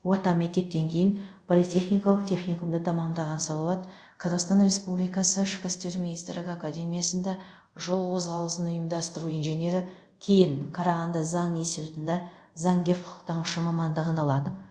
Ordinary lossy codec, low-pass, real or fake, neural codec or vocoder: Opus, 64 kbps; 9.9 kHz; fake; codec, 24 kHz, 0.5 kbps, DualCodec